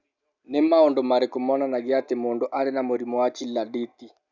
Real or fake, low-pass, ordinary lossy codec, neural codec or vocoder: real; 7.2 kHz; none; none